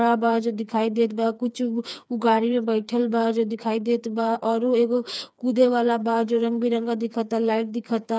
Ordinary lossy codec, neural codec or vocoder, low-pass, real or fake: none; codec, 16 kHz, 4 kbps, FreqCodec, smaller model; none; fake